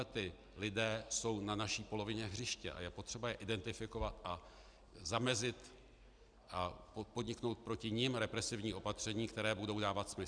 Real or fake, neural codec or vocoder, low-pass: real; none; 9.9 kHz